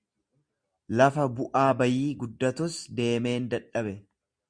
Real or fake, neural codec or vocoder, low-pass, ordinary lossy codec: real; none; 9.9 kHz; Opus, 64 kbps